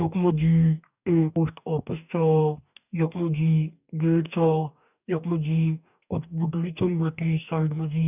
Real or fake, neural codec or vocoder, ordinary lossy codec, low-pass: fake; codec, 44.1 kHz, 2.6 kbps, DAC; none; 3.6 kHz